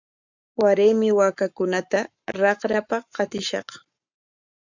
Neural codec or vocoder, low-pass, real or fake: codec, 44.1 kHz, 7.8 kbps, Pupu-Codec; 7.2 kHz; fake